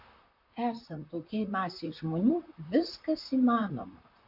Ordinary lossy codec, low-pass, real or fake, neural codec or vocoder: AAC, 48 kbps; 5.4 kHz; fake; vocoder, 44.1 kHz, 128 mel bands, Pupu-Vocoder